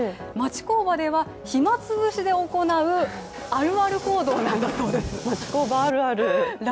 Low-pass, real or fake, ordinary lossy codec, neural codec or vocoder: none; real; none; none